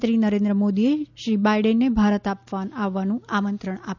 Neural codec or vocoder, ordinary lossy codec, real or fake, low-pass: none; none; real; 7.2 kHz